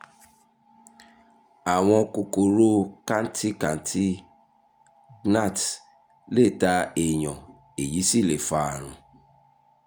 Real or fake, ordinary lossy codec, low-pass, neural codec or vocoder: real; none; none; none